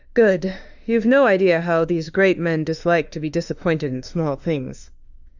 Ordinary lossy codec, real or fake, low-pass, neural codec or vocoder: Opus, 64 kbps; fake; 7.2 kHz; autoencoder, 48 kHz, 32 numbers a frame, DAC-VAE, trained on Japanese speech